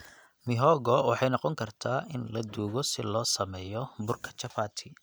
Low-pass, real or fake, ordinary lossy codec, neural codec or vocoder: none; real; none; none